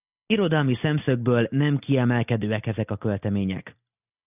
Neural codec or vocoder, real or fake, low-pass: none; real; 3.6 kHz